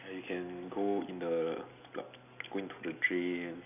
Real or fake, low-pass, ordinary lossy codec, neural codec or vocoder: real; 3.6 kHz; none; none